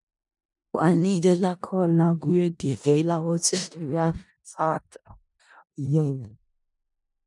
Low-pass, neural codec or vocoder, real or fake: 10.8 kHz; codec, 16 kHz in and 24 kHz out, 0.4 kbps, LongCat-Audio-Codec, four codebook decoder; fake